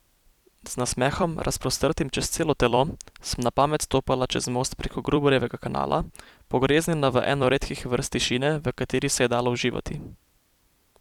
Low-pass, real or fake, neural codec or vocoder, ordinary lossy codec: 19.8 kHz; fake; vocoder, 44.1 kHz, 128 mel bands every 256 samples, BigVGAN v2; none